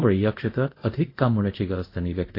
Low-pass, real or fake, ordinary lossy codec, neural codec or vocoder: 5.4 kHz; fake; AAC, 32 kbps; codec, 24 kHz, 0.5 kbps, DualCodec